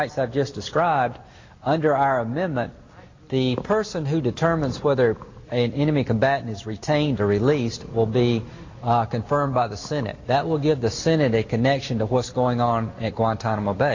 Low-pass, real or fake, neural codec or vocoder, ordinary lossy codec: 7.2 kHz; real; none; MP3, 64 kbps